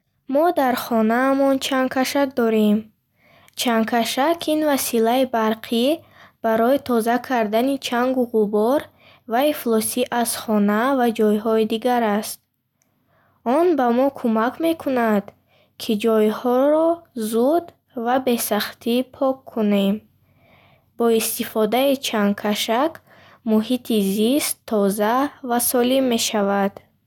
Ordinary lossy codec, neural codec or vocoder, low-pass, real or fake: none; none; 19.8 kHz; real